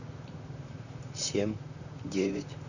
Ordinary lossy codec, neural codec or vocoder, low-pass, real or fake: none; vocoder, 44.1 kHz, 128 mel bands, Pupu-Vocoder; 7.2 kHz; fake